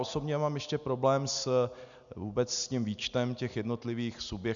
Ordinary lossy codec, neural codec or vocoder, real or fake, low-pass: MP3, 96 kbps; none; real; 7.2 kHz